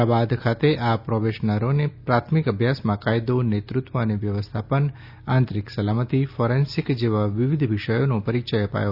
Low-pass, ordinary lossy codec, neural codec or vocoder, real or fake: 5.4 kHz; Opus, 64 kbps; none; real